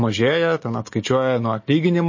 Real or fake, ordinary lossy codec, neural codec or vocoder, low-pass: real; MP3, 32 kbps; none; 7.2 kHz